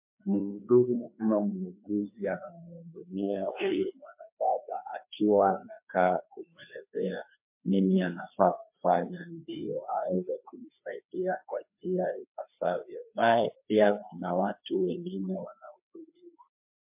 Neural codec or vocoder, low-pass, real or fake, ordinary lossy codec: codec, 16 kHz, 2 kbps, FreqCodec, larger model; 3.6 kHz; fake; MP3, 32 kbps